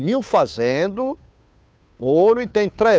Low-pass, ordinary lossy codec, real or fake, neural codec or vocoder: none; none; fake; codec, 16 kHz, 2 kbps, FunCodec, trained on Chinese and English, 25 frames a second